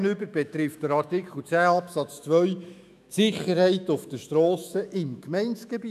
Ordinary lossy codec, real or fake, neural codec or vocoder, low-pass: none; fake; autoencoder, 48 kHz, 128 numbers a frame, DAC-VAE, trained on Japanese speech; 14.4 kHz